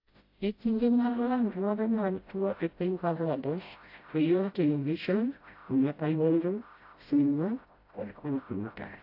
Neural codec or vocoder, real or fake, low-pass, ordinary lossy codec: codec, 16 kHz, 0.5 kbps, FreqCodec, smaller model; fake; 5.4 kHz; AAC, 32 kbps